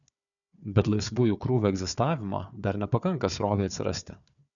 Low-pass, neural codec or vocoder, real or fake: 7.2 kHz; codec, 16 kHz, 4 kbps, FunCodec, trained on Chinese and English, 50 frames a second; fake